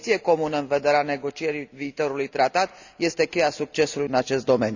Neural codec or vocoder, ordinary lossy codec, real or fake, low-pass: none; none; real; 7.2 kHz